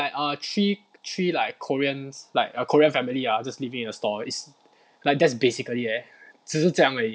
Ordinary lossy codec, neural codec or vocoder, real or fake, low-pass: none; none; real; none